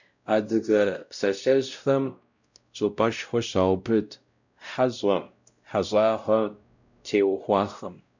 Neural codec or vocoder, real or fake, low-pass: codec, 16 kHz, 0.5 kbps, X-Codec, WavLM features, trained on Multilingual LibriSpeech; fake; 7.2 kHz